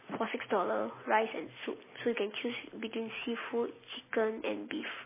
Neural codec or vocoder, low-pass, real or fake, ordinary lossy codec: none; 3.6 kHz; real; MP3, 16 kbps